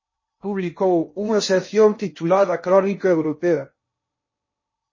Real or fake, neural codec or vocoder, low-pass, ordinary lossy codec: fake; codec, 16 kHz in and 24 kHz out, 0.6 kbps, FocalCodec, streaming, 2048 codes; 7.2 kHz; MP3, 32 kbps